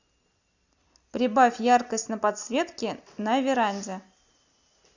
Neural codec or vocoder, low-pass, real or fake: none; 7.2 kHz; real